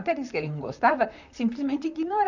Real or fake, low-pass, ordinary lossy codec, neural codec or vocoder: fake; 7.2 kHz; none; vocoder, 44.1 kHz, 128 mel bands, Pupu-Vocoder